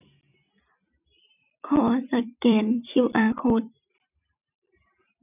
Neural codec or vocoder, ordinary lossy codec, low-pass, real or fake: vocoder, 44.1 kHz, 128 mel bands every 256 samples, BigVGAN v2; none; 3.6 kHz; fake